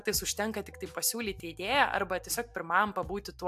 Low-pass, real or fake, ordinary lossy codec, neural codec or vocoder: 14.4 kHz; real; AAC, 96 kbps; none